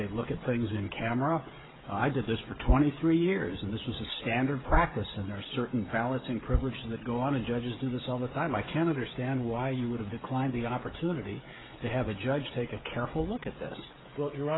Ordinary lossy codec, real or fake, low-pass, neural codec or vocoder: AAC, 16 kbps; fake; 7.2 kHz; codec, 16 kHz, 16 kbps, FreqCodec, smaller model